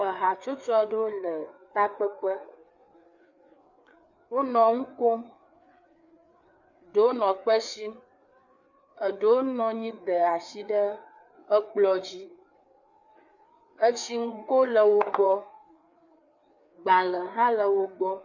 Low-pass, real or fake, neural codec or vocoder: 7.2 kHz; fake; codec, 16 kHz, 4 kbps, FreqCodec, larger model